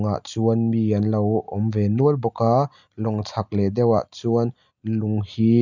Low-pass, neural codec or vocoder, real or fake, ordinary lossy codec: 7.2 kHz; none; real; none